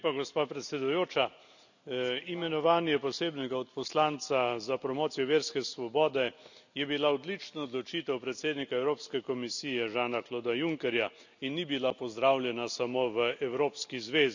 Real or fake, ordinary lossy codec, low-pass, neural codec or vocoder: real; none; 7.2 kHz; none